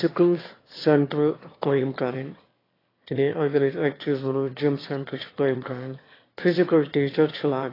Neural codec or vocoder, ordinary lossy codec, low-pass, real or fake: autoencoder, 22.05 kHz, a latent of 192 numbers a frame, VITS, trained on one speaker; AAC, 24 kbps; 5.4 kHz; fake